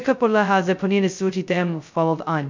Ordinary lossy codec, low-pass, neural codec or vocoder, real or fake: MP3, 64 kbps; 7.2 kHz; codec, 16 kHz, 0.2 kbps, FocalCodec; fake